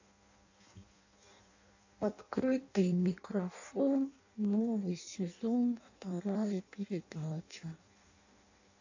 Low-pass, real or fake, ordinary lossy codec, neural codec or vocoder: 7.2 kHz; fake; AAC, 48 kbps; codec, 16 kHz in and 24 kHz out, 0.6 kbps, FireRedTTS-2 codec